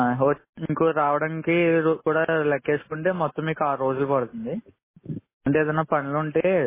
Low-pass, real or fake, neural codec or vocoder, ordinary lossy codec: 3.6 kHz; real; none; MP3, 16 kbps